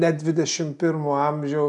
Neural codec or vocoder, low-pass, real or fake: none; 10.8 kHz; real